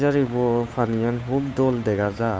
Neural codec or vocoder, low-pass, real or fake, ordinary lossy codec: codec, 16 kHz, 8 kbps, FunCodec, trained on Chinese and English, 25 frames a second; none; fake; none